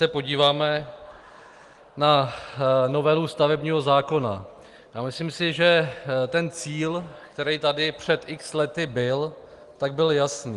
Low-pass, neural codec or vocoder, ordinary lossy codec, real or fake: 10.8 kHz; none; Opus, 24 kbps; real